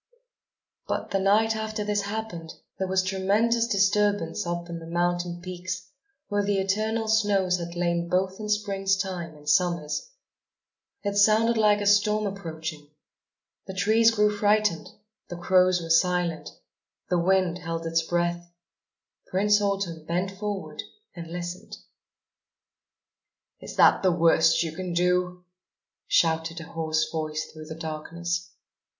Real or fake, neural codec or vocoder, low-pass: real; none; 7.2 kHz